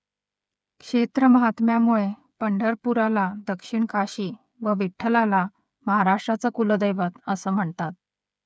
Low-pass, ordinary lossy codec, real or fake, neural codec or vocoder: none; none; fake; codec, 16 kHz, 8 kbps, FreqCodec, smaller model